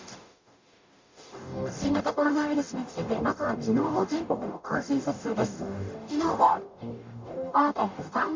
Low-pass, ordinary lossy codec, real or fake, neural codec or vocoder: 7.2 kHz; MP3, 64 kbps; fake; codec, 44.1 kHz, 0.9 kbps, DAC